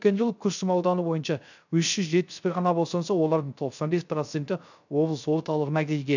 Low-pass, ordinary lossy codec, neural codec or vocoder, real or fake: 7.2 kHz; none; codec, 16 kHz, 0.3 kbps, FocalCodec; fake